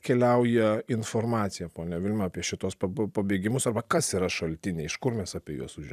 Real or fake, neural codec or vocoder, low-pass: fake; vocoder, 44.1 kHz, 128 mel bands every 256 samples, BigVGAN v2; 14.4 kHz